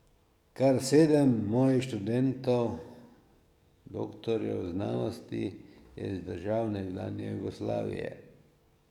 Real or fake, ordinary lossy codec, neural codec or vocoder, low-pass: fake; none; codec, 44.1 kHz, 7.8 kbps, DAC; 19.8 kHz